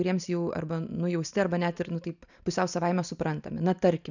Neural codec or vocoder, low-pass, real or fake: none; 7.2 kHz; real